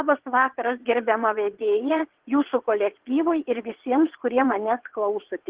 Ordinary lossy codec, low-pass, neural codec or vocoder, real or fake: Opus, 16 kbps; 3.6 kHz; vocoder, 22.05 kHz, 80 mel bands, WaveNeXt; fake